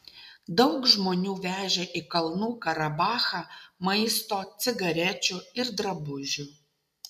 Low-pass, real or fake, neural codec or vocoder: 14.4 kHz; real; none